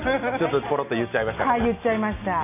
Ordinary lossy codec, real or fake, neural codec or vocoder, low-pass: none; real; none; 3.6 kHz